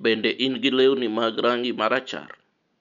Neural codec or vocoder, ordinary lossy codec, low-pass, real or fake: none; none; 7.2 kHz; real